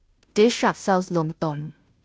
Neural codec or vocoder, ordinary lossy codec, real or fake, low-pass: codec, 16 kHz, 0.5 kbps, FunCodec, trained on Chinese and English, 25 frames a second; none; fake; none